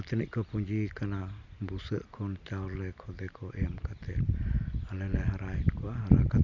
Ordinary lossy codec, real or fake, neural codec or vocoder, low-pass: none; real; none; 7.2 kHz